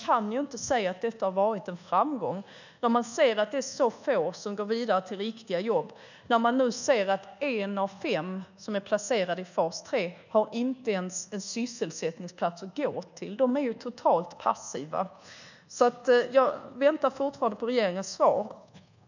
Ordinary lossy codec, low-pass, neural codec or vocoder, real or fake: none; 7.2 kHz; codec, 24 kHz, 1.2 kbps, DualCodec; fake